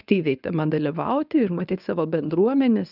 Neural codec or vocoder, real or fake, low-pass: codec, 24 kHz, 0.9 kbps, WavTokenizer, medium speech release version 1; fake; 5.4 kHz